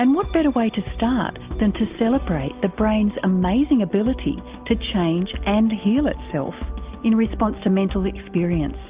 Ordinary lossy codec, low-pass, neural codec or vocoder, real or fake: Opus, 24 kbps; 3.6 kHz; none; real